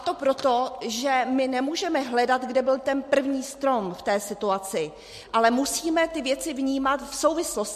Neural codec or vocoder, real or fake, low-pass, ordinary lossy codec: none; real; 14.4 kHz; MP3, 64 kbps